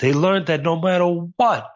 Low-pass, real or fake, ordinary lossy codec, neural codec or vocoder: 7.2 kHz; real; MP3, 32 kbps; none